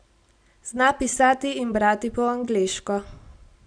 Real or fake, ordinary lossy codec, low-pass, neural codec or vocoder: real; none; 9.9 kHz; none